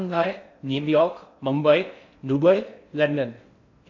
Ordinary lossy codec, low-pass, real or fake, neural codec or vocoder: AAC, 48 kbps; 7.2 kHz; fake; codec, 16 kHz in and 24 kHz out, 0.6 kbps, FocalCodec, streaming, 4096 codes